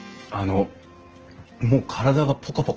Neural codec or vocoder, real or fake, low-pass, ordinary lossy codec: none; real; 7.2 kHz; Opus, 16 kbps